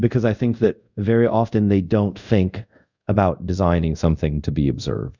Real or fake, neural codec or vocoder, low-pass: fake; codec, 24 kHz, 0.5 kbps, DualCodec; 7.2 kHz